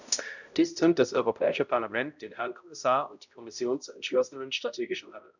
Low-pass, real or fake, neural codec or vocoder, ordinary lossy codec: 7.2 kHz; fake; codec, 16 kHz, 0.5 kbps, X-Codec, HuBERT features, trained on balanced general audio; none